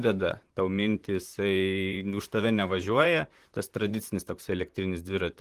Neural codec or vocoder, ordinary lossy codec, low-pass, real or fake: vocoder, 44.1 kHz, 128 mel bands, Pupu-Vocoder; Opus, 24 kbps; 14.4 kHz; fake